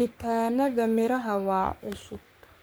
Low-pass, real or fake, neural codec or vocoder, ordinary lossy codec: none; fake; codec, 44.1 kHz, 3.4 kbps, Pupu-Codec; none